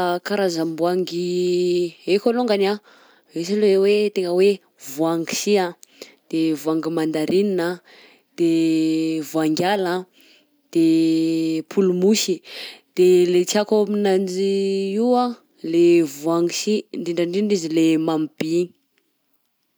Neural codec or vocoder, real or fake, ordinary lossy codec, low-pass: none; real; none; none